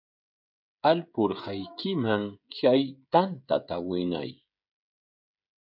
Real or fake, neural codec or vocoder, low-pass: fake; codec, 16 kHz, 16 kbps, FreqCodec, smaller model; 5.4 kHz